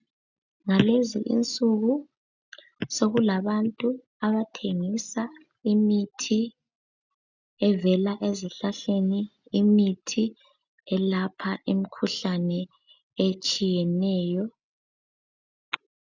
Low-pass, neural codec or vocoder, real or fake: 7.2 kHz; none; real